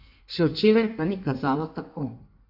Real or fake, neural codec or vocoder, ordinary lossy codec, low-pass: fake; codec, 16 kHz in and 24 kHz out, 1.1 kbps, FireRedTTS-2 codec; AAC, 48 kbps; 5.4 kHz